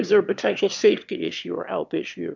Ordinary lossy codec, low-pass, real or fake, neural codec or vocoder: MP3, 64 kbps; 7.2 kHz; fake; autoencoder, 22.05 kHz, a latent of 192 numbers a frame, VITS, trained on one speaker